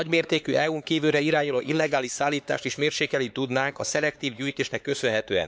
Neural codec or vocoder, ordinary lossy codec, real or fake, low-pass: codec, 16 kHz, 4 kbps, X-Codec, HuBERT features, trained on LibriSpeech; none; fake; none